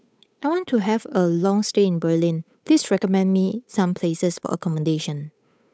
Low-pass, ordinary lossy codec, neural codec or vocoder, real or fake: none; none; codec, 16 kHz, 8 kbps, FunCodec, trained on Chinese and English, 25 frames a second; fake